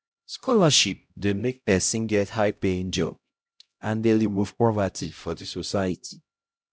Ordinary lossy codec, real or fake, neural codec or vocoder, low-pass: none; fake; codec, 16 kHz, 0.5 kbps, X-Codec, HuBERT features, trained on LibriSpeech; none